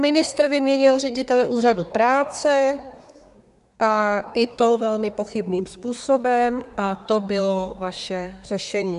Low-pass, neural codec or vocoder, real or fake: 10.8 kHz; codec, 24 kHz, 1 kbps, SNAC; fake